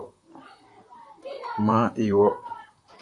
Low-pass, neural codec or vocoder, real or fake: 10.8 kHz; vocoder, 44.1 kHz, 128 mel bands, Pupu-Vocoder; fake